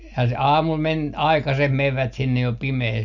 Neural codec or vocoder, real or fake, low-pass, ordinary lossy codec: none; real; 7.2 kHz; none